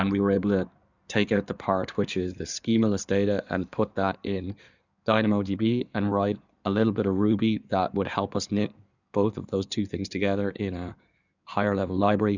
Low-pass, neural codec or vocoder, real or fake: 7.2 kHz; codec, 16 kHz in and 24 kHz out, 2.2 kbps, FireRedTTS-2 codec; fake